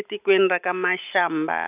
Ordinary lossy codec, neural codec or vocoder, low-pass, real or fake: none; none; 3.6 kHz; real